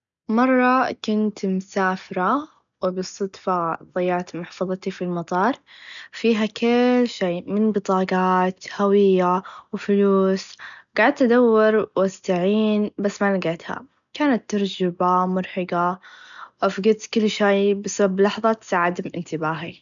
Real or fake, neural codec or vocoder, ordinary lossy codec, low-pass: real; none; none; 7.2 kHz